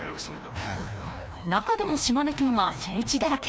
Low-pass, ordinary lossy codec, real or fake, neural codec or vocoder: none; none; fake; codec, 16 kHz, 1 kbps, FreqCodec, larger model